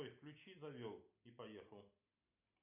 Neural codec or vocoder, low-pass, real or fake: none; 3.6 kHz; real